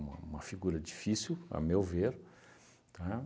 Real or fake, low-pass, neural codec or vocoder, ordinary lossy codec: real; none; none; none